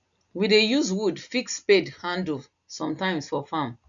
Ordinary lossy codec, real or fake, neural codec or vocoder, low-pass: none; real; none; 7.2 kHz